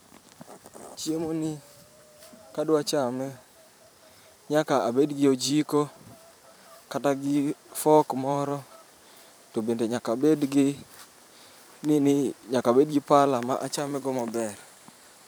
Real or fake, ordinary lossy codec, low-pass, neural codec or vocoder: fake; none; none; vocoder, 44.1 kHz, 128 mel bands every 512 samples, BigVGAN v2